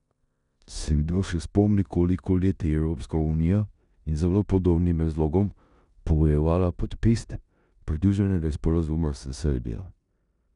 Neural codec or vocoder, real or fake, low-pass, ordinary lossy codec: codec, 16 kHz in and 24 kHz out, 0.9 kbps, LongCat-Audio-Codec, four codebook decoder; fake; 10.8 kHz; none